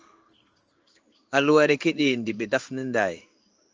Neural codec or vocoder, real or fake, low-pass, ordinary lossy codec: codec, 16 kHz in and 24 kHz out, 1 kbps, XY-Tokenizer; fake; 7.2 kHz; Opus, 24 kbps